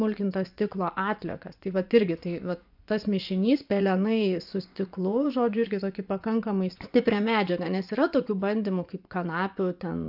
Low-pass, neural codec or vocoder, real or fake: 5.4 kHz; vocoder, 24 kHz, 100 mel bands, Vocos; fake